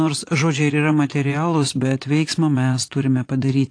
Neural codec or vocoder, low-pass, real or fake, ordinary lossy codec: vocoder, 24 kHz, 100 mel bands, Vocos; 9.9 kHz; fake; AAC, 48 kbps